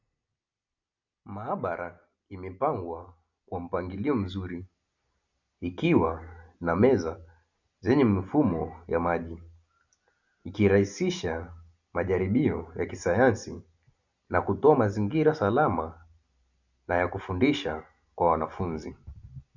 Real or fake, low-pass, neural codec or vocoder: real; 7.2 kHz; none